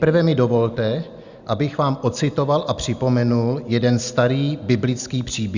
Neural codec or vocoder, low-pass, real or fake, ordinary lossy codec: none; 7.2 kHz; real; Opus, 64 kbps